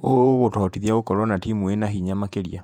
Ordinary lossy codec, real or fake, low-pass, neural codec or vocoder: none; fake; 19.8 kHz; vocoder, 48 kHz, 128 mel bands, Vocos